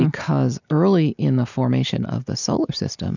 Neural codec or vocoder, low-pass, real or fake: none; 7.2 kHz; real